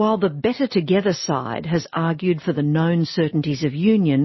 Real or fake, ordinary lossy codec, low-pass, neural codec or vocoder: real; MP3, 24 kbps; 7.2 kHz; none